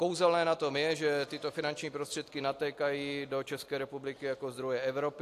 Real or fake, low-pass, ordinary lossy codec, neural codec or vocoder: real; 14.4 kHz; AAC, 64 kbps; none